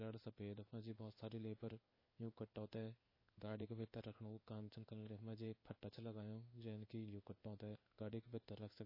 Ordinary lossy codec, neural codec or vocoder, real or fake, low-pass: MP3, 24 kbps; codec, 16 kHz in and 24 kHz out, 1 kbps, XY-Tokenizer; fake; 5.4 kHz